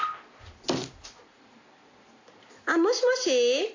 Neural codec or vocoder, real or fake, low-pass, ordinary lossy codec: none; real; 7.2 kHz; AAC, 48 kbps